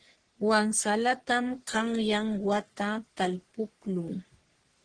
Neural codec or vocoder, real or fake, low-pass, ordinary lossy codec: codec, 44.1 kHz, 3.4 kbps, Pupu-Codec; fake; 9.9 kHz; Opus, 16 kbps